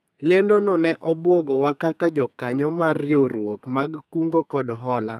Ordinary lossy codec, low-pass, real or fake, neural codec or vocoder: none; 14.4 kHz; fake; codec, 32 kHz, 1.9 kbps, SNAC